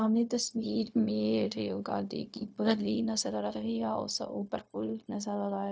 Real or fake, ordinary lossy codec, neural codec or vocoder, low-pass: fake; none; codec, 16 kHz, 0.4 kbps, LongCat-Audio-Codec; none